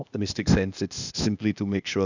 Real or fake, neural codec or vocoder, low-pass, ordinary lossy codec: fake; codec, 16 kHz, 0.8 kbps, ZipCodec; 7.2 kHz; none